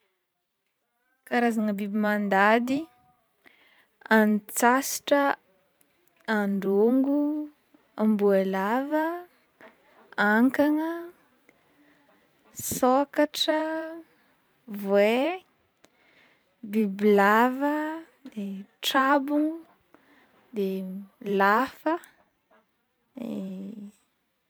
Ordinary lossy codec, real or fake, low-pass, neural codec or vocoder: none; fake; none; vocoder, 44.1 kHz, 128 mel bands every 512 samples, BigVGAN v2